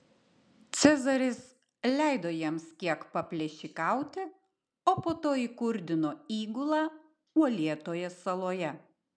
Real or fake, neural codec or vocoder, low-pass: real; none; 9.9 kHz